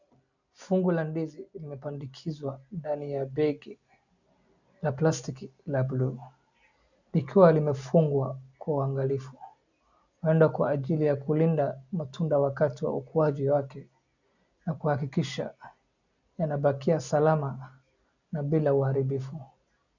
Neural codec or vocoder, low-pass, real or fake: none; 7.2 kHz; real